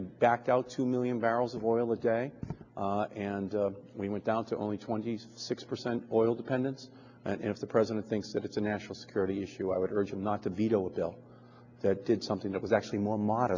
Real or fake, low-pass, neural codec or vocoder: real; 7.2 kHz; none